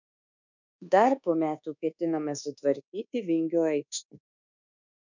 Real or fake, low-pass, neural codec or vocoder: fake; 7.2 kHz; codec, 24 kHz, 1.2 kbps, DualCodec